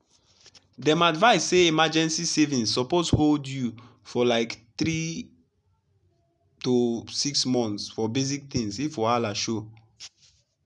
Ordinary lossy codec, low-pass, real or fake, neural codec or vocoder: none; 10.8 kHz; real; none